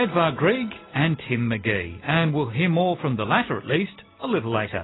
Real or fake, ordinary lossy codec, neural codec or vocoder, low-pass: real; AAC, 16 kbps; none; 7.2 kHz